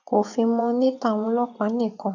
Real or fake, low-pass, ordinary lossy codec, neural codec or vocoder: fake; 7.2 kHz; none; vocoder, 24 kHz, 100 mel bands, Vocos